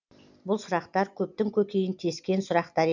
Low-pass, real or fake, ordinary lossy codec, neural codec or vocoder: 7.2 kHz; real; none; none